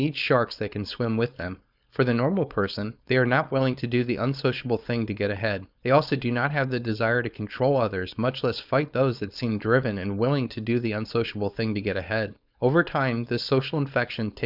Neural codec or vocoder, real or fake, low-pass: codec, 16 kHz, 4.8 kbps, FACodec; fake; 5.4 kHz